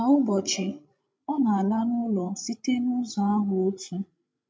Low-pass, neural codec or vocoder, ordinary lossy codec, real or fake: none; codec, 16 kHz, 16 kbps, FreqCodec, larger model; none; fake